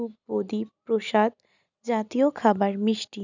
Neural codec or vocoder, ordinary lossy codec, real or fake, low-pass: none; none; real; 7.2 kHz